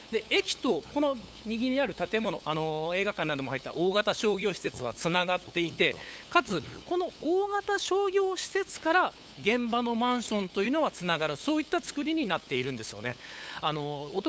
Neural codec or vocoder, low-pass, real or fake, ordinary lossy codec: codec, 16 kHz, 8 kbps, FunCodec, trained on LibriTTS, 25 frames a second; none; fake; none